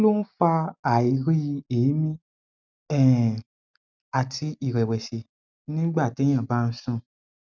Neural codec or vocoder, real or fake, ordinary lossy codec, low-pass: none; real; none; 7.2 kHz